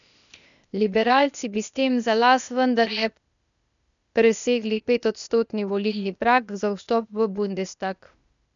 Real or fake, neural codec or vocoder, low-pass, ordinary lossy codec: fake; codec, 16 kHz, 0.8 kbps, ZipCodec; 7.2 kHz; none